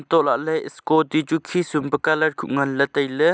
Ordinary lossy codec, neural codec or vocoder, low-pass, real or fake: none; none; none; real